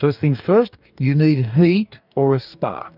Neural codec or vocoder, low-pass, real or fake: codec, 44.1 kHz, 2.6 kbps, DAC; 5.4 kHz; fake